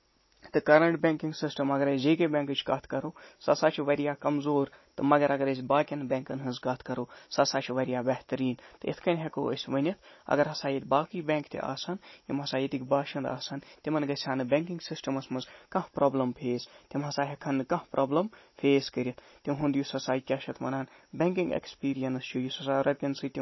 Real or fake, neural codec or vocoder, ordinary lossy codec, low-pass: real; none; MP3, 24 kbps; 7.2 kHz